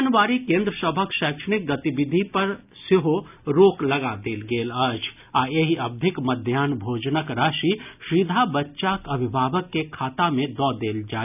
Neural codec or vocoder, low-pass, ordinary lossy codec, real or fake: none; 3.6 kHz; none; real